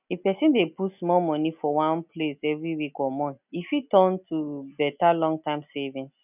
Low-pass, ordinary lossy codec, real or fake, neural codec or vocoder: 3.6 kHz; none; real; none